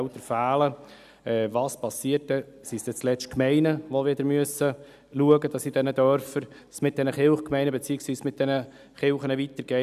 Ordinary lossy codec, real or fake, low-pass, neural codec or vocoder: none; real; 14.4 kHz; none